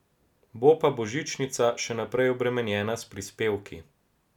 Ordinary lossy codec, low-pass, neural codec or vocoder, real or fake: none; 19.8 kHz; none; real